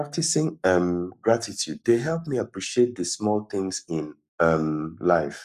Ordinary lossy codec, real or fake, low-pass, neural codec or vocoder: none; fake; 14.4 kHz; codec, 44.1 kHz, 7.8 kbps, Pupu-Codec